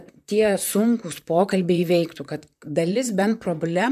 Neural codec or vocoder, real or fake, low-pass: vocoder, 44.1 kHz, 128 mel bands, Pupu-Vocoder; fake; 14.4 kHz